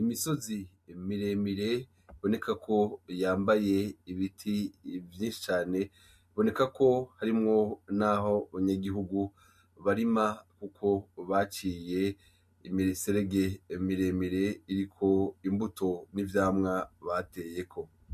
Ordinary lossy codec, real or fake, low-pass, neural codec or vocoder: MP3, 64 kbps; real; 14.4 kHz; none